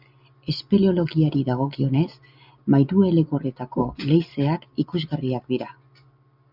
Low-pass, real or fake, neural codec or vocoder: 5.4 kHz; real; none